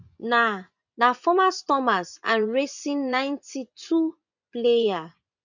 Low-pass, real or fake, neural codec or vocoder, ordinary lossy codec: 7.2 kHz; fake; vocoder, 24 kHz, 100 mel bands, Vocos; none